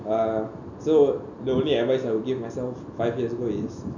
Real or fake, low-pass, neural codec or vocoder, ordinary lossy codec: real; 7.2 kHz; none; none